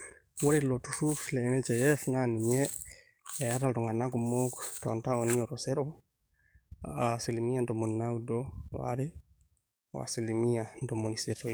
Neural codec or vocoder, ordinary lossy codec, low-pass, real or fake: codec, 44.1 kHz, 7.8 kbps, DAC; none; none; fake